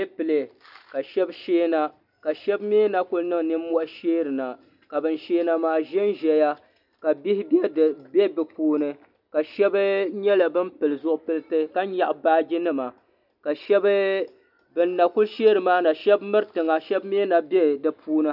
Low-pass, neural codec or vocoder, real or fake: 5.4 kHz; none; real